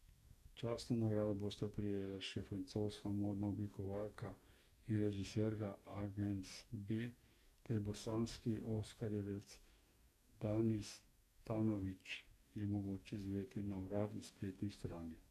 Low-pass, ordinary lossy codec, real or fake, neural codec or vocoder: 14.4 kHz; none; fake; codec, 44.1 kHz, 2.6 kbps, DAC